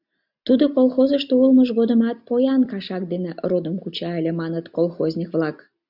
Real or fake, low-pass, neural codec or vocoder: real; 5.4 kHz; none